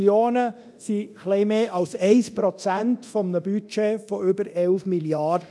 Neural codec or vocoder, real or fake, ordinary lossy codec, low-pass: codec, 24 kHz, 0.9 kbps, DualCodec; fake; none; none